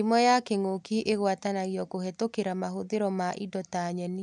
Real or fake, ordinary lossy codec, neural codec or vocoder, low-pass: real; none; none; 10.8 kHz